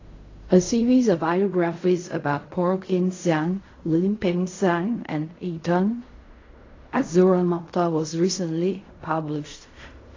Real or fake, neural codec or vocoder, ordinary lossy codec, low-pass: fake; codec, 16 kHz in and 24 kHz out, 0.4 kbps, LongCat-Audio-Codec, fine tuned four codebook decoder; AAC, 32 kbps; 7.2 kHz